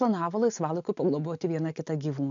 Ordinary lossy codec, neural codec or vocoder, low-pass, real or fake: AAC, 64 kbps; none; 7.2 kHz; real